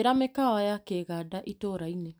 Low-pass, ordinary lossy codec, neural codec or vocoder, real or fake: none; none; none; real